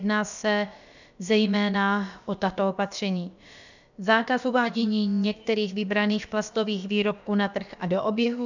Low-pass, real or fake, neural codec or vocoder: 7.2 kHz; fake; codec, 16 kHz, about 1 kbps, DyCAST, with the encoder's durations